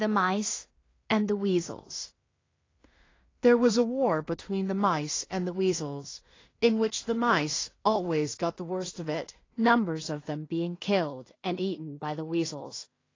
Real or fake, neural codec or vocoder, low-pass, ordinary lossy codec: fake; codec, 16 kHz in and 24 kHz out, 0.4 kbps, LongCat-Audio-Codec, two codebook decoder; 7.2 kHz; AAC, 32 kbps